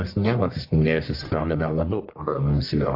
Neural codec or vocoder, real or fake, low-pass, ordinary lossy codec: codec, 44.1 kHz, 1.7 kbps, Pupu-Codec; fake; 5.4 kHz; AAC, 32 kbps